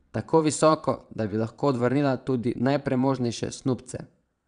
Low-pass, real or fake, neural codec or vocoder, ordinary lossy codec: 9.9 kHz; fake; vocoder, 22.05 kHz, 80 mel bands, Vocos; none